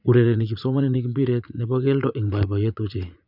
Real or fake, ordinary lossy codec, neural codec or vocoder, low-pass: fake; none; vocoder, 44.1 kHz, 80 mel bands, Vocos; 5.4 kHz